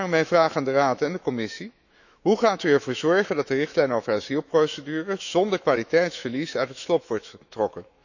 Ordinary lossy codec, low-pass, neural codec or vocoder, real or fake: none; 7.2 kHz; autoencoder, 48 kHz, 128 numbers a frame, DAC-VAE, trained on Japanese speech; fake